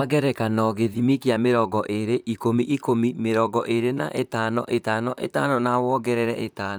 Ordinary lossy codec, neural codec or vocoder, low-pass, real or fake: none; vocoder, 44.1 kHz, 128 mel bands, Pupu-Vocoder; none; fake